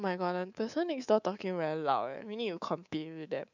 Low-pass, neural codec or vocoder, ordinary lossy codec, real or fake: 7.2 kHz; codec, 24 kHz, 3.1 kbps, DualCodec; none; fake